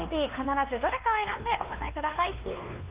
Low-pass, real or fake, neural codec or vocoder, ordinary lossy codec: 3.6 kHz; fake; codec, 16 kHz, 2 kbps, X-Codec, WavLM features, trained on Multilingual LibriSpeech; Opus, 24 kbps